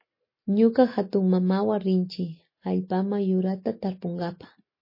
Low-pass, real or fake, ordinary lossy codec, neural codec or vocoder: 5.4 kHz; real; MP3, 24 kbps; none